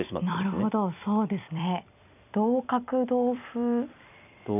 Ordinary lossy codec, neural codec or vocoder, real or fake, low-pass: none; vocoder, 44.1 kHz, 128 mel bands every 256 samples, BigVGAN v2; fake; 3.6 kHz